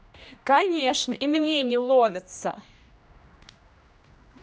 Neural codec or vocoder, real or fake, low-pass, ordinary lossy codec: codec, 16 kHz, 1 kbps, X-Codec, HuBERT features, trained on general audio; fake; none; none